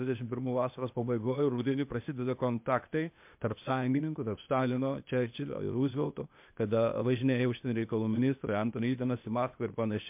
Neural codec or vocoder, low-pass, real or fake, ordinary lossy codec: codec, 16 kHz, 0.8 kbps, ZipCodec; 3.6 kHz; fake; MP3, 32 kbps